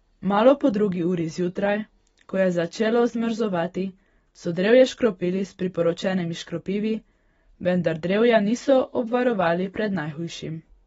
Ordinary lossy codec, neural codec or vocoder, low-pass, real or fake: AAC, 24 kbps; none; 19.8 kHz; real